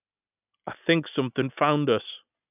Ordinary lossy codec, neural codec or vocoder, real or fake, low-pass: none; none; real; 3.6 kHz